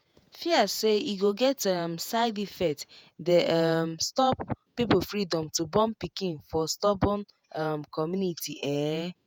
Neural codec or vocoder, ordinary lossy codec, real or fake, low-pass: vocoder, 48 kHz, 128 mel bands, Vocos; none; fake; none